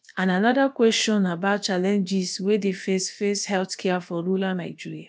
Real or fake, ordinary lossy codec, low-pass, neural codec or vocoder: fake; none; none; codec, 16 kHz, about 1 kbps, DyCAST, with the encoder's durations